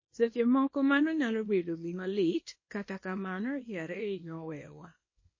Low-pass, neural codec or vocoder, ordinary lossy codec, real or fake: 7.2 kHz; codec, 24 kHz, 0.9 kbps, WavTokenizer, small release; MP3, 32 kbps; fake